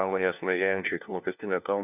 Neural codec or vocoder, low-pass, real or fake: codec, 16 kHz, 1 kbps, FunCodec, trained on LibriTTS, 50 frames a second; 3.6 kHz; fake